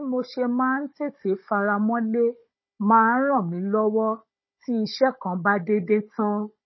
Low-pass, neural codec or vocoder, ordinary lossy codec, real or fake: 7.2 kHz; codec, 16 kHz, 16 kbps, FunCodec, trained on Chinese and English, 50 frames a second; MP3, 24 kbps; fake